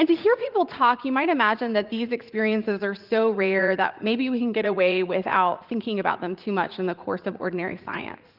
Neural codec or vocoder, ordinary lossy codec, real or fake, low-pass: vocoder, 44.1 kHz, 80 mel bands, Vocos; Opus, 32 kbps; fake; 5.4 kHz